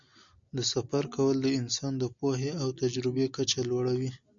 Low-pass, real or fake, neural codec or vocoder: 7.2 kHz; real; none